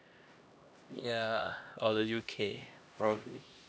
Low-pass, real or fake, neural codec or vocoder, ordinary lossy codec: none; fake; codec, 16 kHz, 1 kbps, X-Codec, HuBERT features, trained on LibriSpeech; none